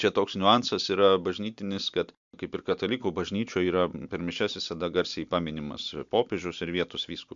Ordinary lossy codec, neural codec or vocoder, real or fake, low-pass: MP3, 64 kbps; none; real; 7.2 kHz